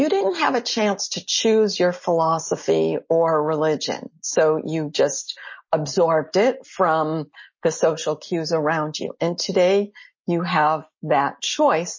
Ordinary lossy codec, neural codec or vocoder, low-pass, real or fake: MP3, 32 kbps; none; 7.2 kHz; real